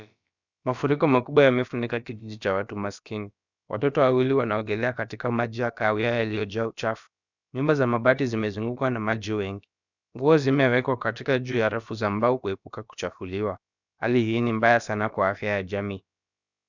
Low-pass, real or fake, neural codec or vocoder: 7.2 kHz; fake; codec, 16 kHz, about 1 kbps, DyCAST, with the encoder's durations